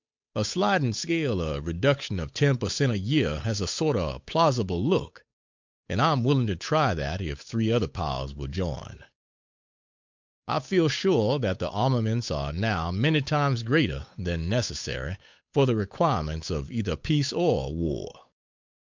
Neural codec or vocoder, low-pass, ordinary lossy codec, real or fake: codec, 16 kHz, 8 kbps, FunCodec, trained on Chinese and English, 25 frames a second; 7.2 kHz; MP3, 64 kbps; fake